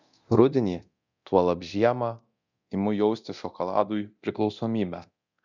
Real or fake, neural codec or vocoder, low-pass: fake; codec, 24 kHz, 0.9 kbps, DualCodec; 7.2 kHz